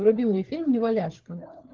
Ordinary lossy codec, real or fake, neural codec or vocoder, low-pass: Opus, 16 kbps; fake; codec, 16 kHz, 4 kbps, FunCodec, trained on LibriTTS, 50 frames a second; 7.2 kHz